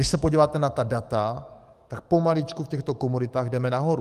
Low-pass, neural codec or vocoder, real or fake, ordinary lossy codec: 14.4 kHz; codec, 44.1 kHz, 7.8 kbps, DAC; fake; Opus, 32 kbps